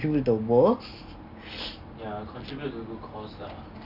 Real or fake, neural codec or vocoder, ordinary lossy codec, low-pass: real; none; none; 5.4 kHz